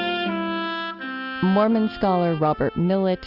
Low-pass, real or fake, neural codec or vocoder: 5.4 kHz; real; none